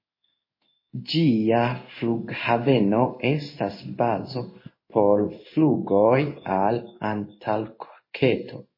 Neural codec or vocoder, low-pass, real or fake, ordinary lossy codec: codec, 16 kHz in and 24 kHz out, 1 kbps, XY-Tokenizer; 5.4 kHz; fake; MP3, 24 kbps